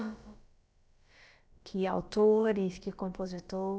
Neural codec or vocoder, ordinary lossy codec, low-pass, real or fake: codec, 16 kHz, about 1 kbps, DyCAST, with the encoder's durations; none; none; fake